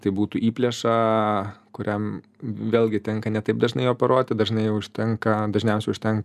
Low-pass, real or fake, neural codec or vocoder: 14.4 kHz; real; none